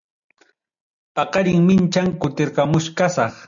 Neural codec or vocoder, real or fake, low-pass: none; real; 7.2 kHz